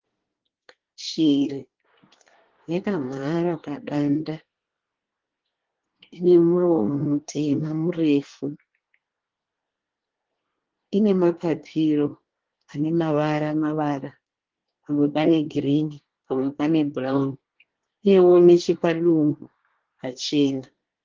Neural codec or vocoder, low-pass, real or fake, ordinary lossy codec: codec, 24 kHz, 1 kbps, SNAC; 7.2 kHz; fake; Opus, 16 kbps